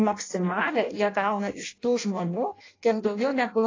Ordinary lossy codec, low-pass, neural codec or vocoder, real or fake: AAC, 32 kbps; 7.2 kHz; codec, 16 kHz in and 24 kHz out, 0.6 kbps, FireRedTTS-2 codec; fake